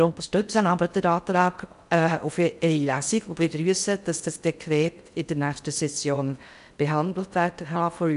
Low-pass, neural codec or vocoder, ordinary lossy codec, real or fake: 10.8 kHz; codec, 16 kHz in and 24 kHz out, 0.6 kbps, FocalCodec, streaming, 4096 codes; none; fake